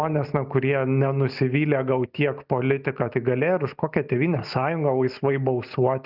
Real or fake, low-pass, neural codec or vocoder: real; 5.4 kHz; none